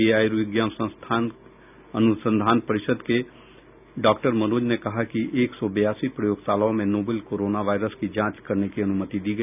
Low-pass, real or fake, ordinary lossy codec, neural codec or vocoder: 3.6 kHz; real; none; none